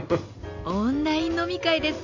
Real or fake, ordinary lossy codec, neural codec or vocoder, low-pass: real; none; none; 7.2 kHz